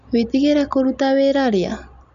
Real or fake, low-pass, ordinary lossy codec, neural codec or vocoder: real; 7.2 kHz; none; none